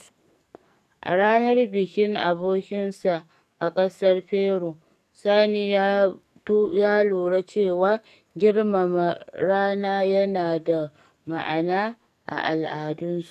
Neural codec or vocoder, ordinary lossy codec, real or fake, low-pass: codec, 44.1 kHz, 2.6 kbps, SNAC; none; fake; 14.4 kHz